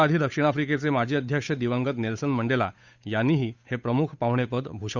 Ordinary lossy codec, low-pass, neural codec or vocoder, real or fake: none; 7.2 kHz; codec, 16 kHz, 8 kbps, FunCodec, trained on Chinese and English, 25 frames a second; fake